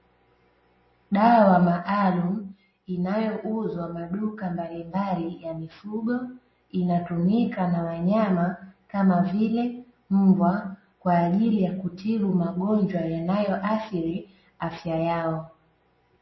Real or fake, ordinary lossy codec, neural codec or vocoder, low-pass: real; MP3, 24 kbps; none; 7.2 kHz